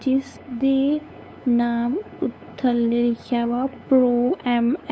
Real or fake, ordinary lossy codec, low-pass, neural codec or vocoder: fake; none; none; codec, 16 kHz, 8 kbps, FunCodec, trained on LibriTTS, 25 frames a second